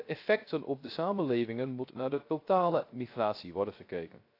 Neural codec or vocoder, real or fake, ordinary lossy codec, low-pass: codec, 16 kHz, 0.3 kbps, FocalCodec; fake; AAC, 32 kbps; 5.4 kHz